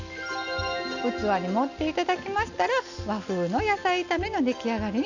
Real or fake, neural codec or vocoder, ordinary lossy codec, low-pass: real; none; none; 7.2 kHz